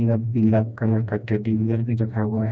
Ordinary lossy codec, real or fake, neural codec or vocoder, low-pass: none; fake; codec, 16 kHz, 1 kbps, FreqCodec, smaller model; none